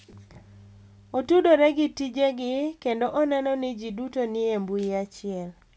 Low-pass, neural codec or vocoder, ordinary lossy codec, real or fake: none; none; none; real